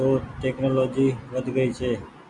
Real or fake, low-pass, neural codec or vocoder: real; 10.8 kHz; none